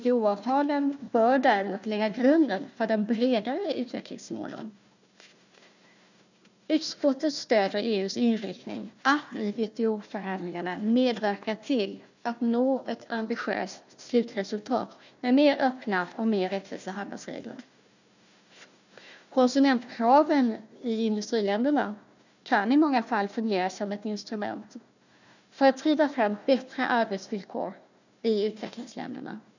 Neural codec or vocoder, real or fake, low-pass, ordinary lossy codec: codec, 16 kHz, 1 kbps, FunCodec, trained on Chinese and English, 50 frames a second; fake; 7.2 kHz; none